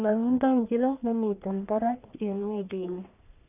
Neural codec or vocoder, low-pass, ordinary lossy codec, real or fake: codec, 44.1 kHz, 1.7 kbps, Pupu-Codec; 3.6 kHz; none; fake